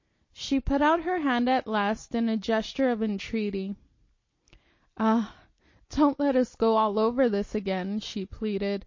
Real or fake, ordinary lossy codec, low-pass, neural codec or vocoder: real; MP3, 32 kbps; 7.2 kHz; none